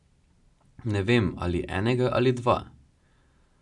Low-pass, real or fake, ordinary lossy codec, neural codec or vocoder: 10.8 kHz; real; none; none